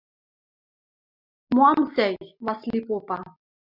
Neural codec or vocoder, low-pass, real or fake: none; 5.4 kHz; real